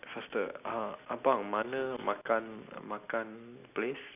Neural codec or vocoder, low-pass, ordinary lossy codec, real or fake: none; 3.6 kHz; none; real